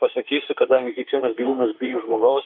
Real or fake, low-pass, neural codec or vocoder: fake; 5.4 kHz; autoencoder, 48 kHz, 32 numbers a frame, DAC-VAE, trained on Japanese speech